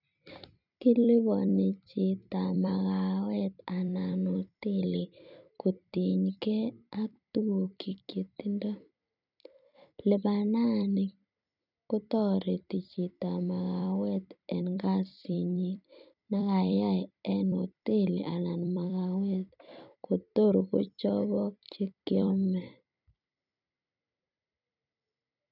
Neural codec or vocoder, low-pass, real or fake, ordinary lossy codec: vocoder, 44.1 kHz, 128 mel bands every 256 samples, BigVGAN v2; 5.4 kHz; fake; none